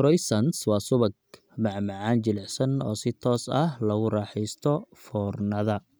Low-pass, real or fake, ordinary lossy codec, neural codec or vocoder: none; real; none; none